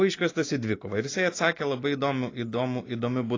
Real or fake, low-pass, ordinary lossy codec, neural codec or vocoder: real; 7.2 kHz; AAC, 32 kbps; none